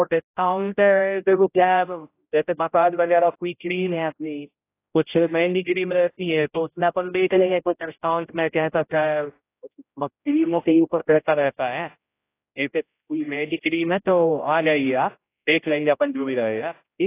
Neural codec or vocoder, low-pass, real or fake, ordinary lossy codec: codec, 16 kHz, 0.5 kbps, X-Codec, HuBERT features, trained on general audio; 3.6 kHz; fake; AAC, 24 kbps